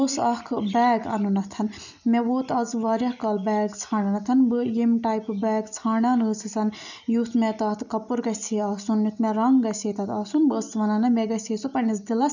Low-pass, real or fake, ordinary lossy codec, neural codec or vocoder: 7.2 kHz; real; none; none